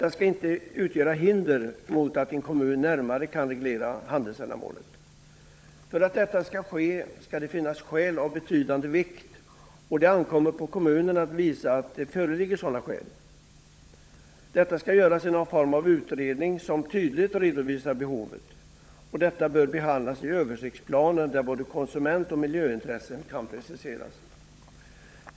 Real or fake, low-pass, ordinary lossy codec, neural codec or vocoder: fake; none; none; codec, 16 kHz, 16 kbps, FunCodec, trained on LibriTTS, 50 frames a second